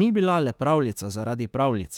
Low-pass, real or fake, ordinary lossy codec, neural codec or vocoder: 19.8 kHz; fake; none; autoencoder, 48 kHz, 32 numbers a frame, DAC-VAE, trained on Japanese speech